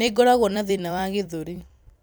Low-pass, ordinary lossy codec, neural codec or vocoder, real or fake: none; none; vocoder, 44.1 kHz, 128 mel bands every 256 samples, BigVGAN v2; fake